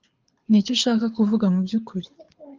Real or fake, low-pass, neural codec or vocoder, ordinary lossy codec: fake; 7.2 kHz; codec, 24 kHz, 6 kbps, HILCodec; Opus, 24 kbps